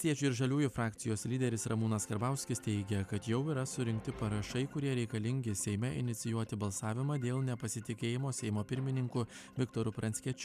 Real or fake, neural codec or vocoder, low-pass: real; none; 14.4 kHz